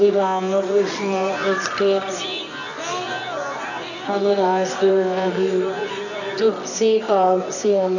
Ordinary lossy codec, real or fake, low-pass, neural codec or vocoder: none; fake; 7.2 kHz; codec, 24 kHz, 0.9 kbps, WavTokenizer, medium music audio release